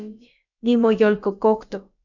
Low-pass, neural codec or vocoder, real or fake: 7.2 kHz; codec, 16 kHz, about 1 kbps, DyCAST, with the encoder's durations; fake